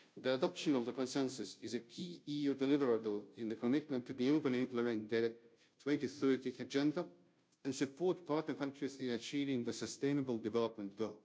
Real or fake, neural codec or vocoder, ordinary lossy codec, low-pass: fake; codec, 16 kHz, 0.5 kbps, FunCodec, trained on Chinese and English, 25 frames a second; none; none